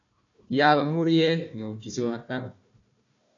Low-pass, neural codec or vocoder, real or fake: 7.2 kHz; codec, 16 kHz, 1 kbps, FunCodec, trained on Chinese and English, 50 frames a second; fake